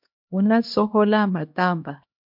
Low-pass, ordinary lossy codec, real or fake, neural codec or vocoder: 5.4 kHz; MP3, 48 kbps; fake; codec, 16 kHz, 1 kbps, X-Codec, HuBERT features, trained on LibriSpeech